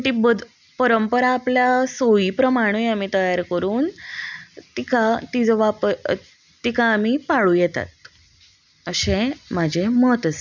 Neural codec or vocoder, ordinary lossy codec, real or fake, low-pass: none; none; real; 7.2 kHz